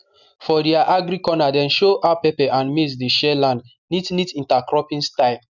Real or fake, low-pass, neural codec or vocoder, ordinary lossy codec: real; 7.2 kHz; none; none